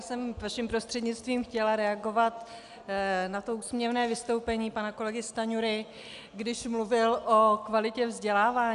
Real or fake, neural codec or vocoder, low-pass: real; none; 10.8 kHz